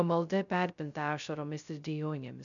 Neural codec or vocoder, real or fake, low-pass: codec, 16 kHz, 0.2 kbps, FocalCodec; fake; 7.2 kHz